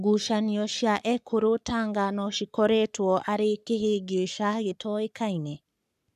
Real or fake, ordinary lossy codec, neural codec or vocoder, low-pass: fake; none; codec, 44.1 kHz, 7.8 kbps, Pupu-Codec; 14.4 kHz